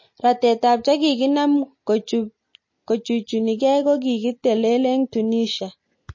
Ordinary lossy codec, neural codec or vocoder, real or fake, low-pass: MP3, 32 kbps; none; real; 7.2 kHz